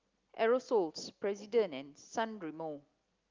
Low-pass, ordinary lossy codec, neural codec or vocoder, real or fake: 7.2 kHz; Opus, 32 kbps; none; real